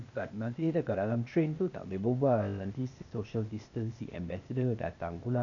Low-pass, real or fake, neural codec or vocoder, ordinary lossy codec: 7.2 kHz; fake; codec, 16 kHz, 0.8 kbps, ZipCodec; none